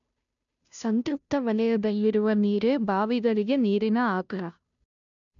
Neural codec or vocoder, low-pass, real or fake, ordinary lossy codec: codec, 16 kHz, 0.5 kbps, FunCodec, trained on Chinese and English, 25 frames a second; 7.2 kHz; fake; none